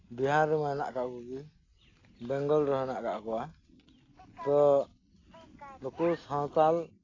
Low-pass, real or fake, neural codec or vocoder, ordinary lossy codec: 7.2 kHz; real; none; AAC, 32 kbps